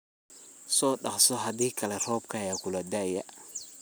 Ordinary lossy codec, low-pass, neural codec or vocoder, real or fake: none; none; none; real